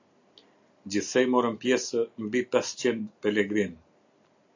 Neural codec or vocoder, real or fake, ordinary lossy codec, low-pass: none; real; AAC, 48 kbps; 7.2 kHz